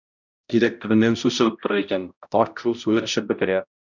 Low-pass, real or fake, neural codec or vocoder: 7.2 kHz; fake; codec, 16 kHz, 0.5 kbps, X-Codec, HuBERT features, trained on balanced general audio